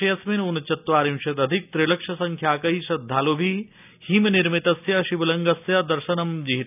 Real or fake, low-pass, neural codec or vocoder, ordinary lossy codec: real; 3.6 kHz; none; none